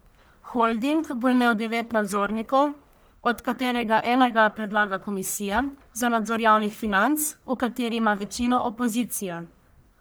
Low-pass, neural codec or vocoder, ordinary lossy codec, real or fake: none; codec, 44.1 kHz, 1.7 kbps, Pupu-Codec; none; fake